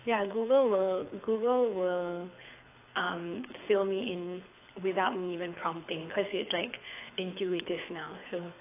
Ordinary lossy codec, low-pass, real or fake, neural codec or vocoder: AAC, 24 kbps; 3.6 kHz; fake; codec, 24 kHz, 6 kbps, HILCodec